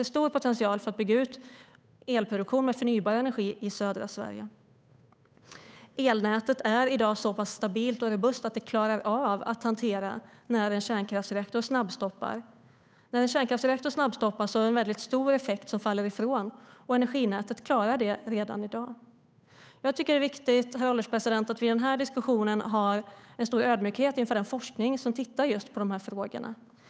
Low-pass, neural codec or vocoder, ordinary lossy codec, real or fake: none; codec, 16 kHz, 8 kbps, FunCodec, trained on Chinese and English, 25 frames a second; none; fake